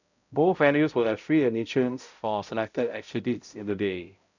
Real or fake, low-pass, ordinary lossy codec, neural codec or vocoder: fake; 7.2 kHz; none; codec, 16 kHz, 0.5 kbps, X-Codec, HuBERT features, trained on balanced general audio